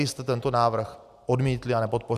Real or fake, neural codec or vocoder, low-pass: real; none; 14.4 kHz